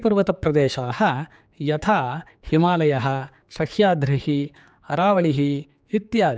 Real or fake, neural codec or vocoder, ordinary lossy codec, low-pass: fake; codec, 16 kHz, 4 kbps, X-Codec, HuBERT features, trained on general audio; none; none